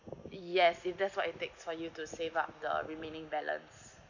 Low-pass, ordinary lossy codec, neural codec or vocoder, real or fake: 7.2 kHz; none; none; real